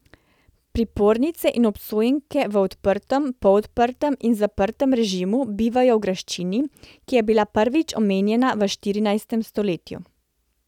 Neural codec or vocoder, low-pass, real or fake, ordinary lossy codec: none; 19.8 kHz; real; none